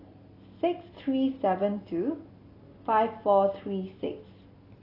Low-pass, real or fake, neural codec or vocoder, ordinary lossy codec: 5.4 kHz; real; none; AAC, 24 kbps